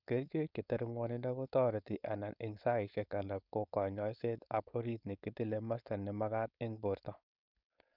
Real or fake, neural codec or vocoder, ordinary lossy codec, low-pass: fake; codec, 16 kHz, 4.8 kbps, FACodec; none; 5.4 kHz